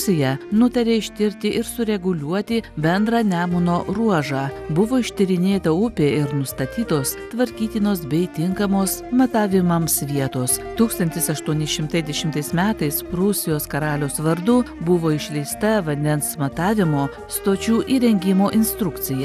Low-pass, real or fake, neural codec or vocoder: 14.4 kHz; real; none